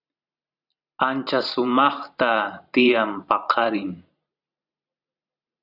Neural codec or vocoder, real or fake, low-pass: vocoder, 44.1 kHz, 128 mel bands every 512 samples, BigVGAN v2; fake; 5.4 kHz